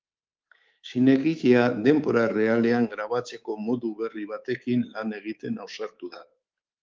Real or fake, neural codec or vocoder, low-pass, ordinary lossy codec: fake; codec, 24 kHz, 3.1 kbps, DualCodec; 7.2 kHz; Opus, 32 kbps